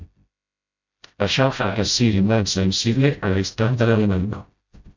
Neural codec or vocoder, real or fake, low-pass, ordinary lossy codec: codec, 16 kHz, 0.5 kbps, FreqCodec, smaller model; fake; 7.2 kHz; MP3, 64 kbps